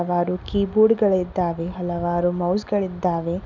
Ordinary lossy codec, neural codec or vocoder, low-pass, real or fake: none; none; 7.2 kHz; real